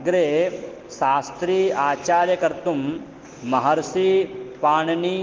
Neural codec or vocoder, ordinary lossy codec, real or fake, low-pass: none; Opus, 16 kbps; real; 7.2 kHz